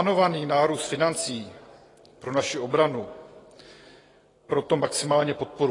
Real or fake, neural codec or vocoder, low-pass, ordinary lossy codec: real; none; 10.8 kHz; AAC, 32 kbps